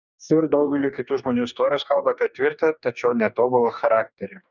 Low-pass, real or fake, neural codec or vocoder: 7.2 kHz; fake; codec, 44.1 kHz, 2.6 kbps, DAC